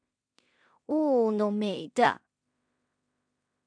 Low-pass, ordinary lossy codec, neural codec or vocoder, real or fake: 9.9 kHz; MP3, 64 kbps; codec, 16 kHz in and 24 kHz out, 0.4 kbps, LongCat-Audio-Codec, two codebook decoder; fake